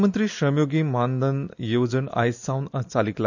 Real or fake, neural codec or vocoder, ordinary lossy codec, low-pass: real; none; none; 7.2 kHz